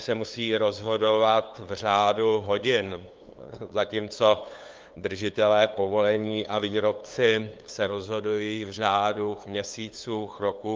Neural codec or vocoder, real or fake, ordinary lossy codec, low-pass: codec, 16 kHz, 2 kbps, FunCodec, trained on LibriTTS, 25 frames a second; fake; Opus, 24 kbps; 7.2 kHz